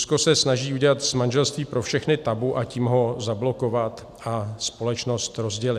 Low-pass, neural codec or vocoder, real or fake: 14.4 kHz; none; real